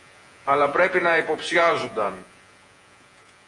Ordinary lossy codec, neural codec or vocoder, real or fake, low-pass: AAC, 48 kbps; vocoder, 48 kHz, 128 mel bands, Vocos; fake; 10.8 kHz